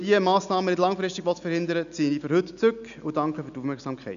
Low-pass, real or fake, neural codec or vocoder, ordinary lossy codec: 7.2 kHz; real; none; none